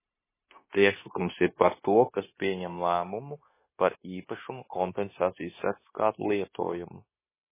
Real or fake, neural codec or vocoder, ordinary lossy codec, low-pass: fake; codec, 16 kHz, 0.9 kbps, LongCat-Audio-Codec; MP3, 16 kbps; 3.6 kHz